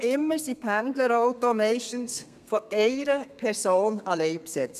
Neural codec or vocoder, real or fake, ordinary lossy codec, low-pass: codec, 32 kHz, 1.9 kbps, SNAC; fake; none; 14.4 kHz